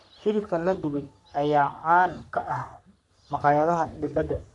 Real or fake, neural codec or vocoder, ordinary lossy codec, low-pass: fake; codec, 44.1 kHz, 3.4 kbps, Pupu-Codec; none; 10.8 kHz